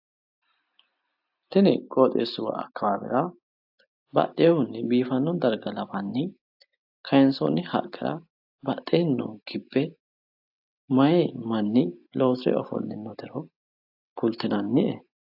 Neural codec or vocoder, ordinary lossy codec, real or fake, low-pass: none; AAC, 48 kbps; real; 5.4 kHz